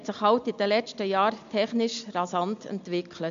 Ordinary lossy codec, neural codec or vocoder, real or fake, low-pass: MP3, 64 kbps; none; real; 7.2 kHz